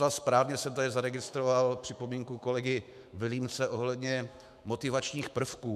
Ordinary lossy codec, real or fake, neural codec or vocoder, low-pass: MP3, 96 kbps; fake; autoencoder, 48 kHz, 128 numbers a frame, DAC-VAE, trained on Japanese speech; 14.4 kHz